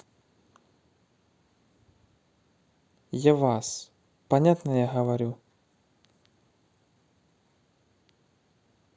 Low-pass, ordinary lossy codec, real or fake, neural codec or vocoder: none; none; real; none